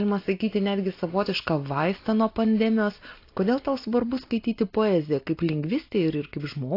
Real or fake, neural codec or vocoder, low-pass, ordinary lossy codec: real; none; 5.4 kHz; AAC, 32 kbps